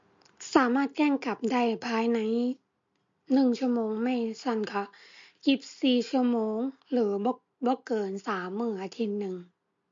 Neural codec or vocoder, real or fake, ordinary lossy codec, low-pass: none; real; MP3, 48 kbps; 7.2 kHz